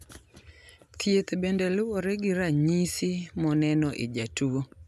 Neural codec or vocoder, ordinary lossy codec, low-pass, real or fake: none; none; 14.4 kHz; real